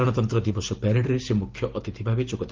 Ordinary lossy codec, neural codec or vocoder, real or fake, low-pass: Opus, 16 kbps; none; real; 7.2 kHz